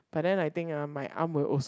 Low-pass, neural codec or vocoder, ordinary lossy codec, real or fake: none; none; none; real